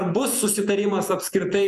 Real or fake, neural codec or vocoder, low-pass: fake; vocoder, 48 kHz, 128 mel bands, Vocos; 14.4 kHz